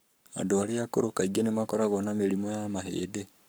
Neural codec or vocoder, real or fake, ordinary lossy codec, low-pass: codec, 44.1 kHz, 7.8 kbps, Pupu-Codec; fake; none; none